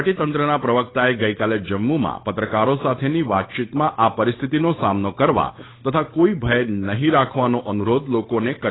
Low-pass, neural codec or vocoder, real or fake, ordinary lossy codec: 7.2 kHz; codec, 16 kHz, 8 kbps, FunCodec, trained on Chinese and English, 25 frames a second; fake; AAC, 16 kbps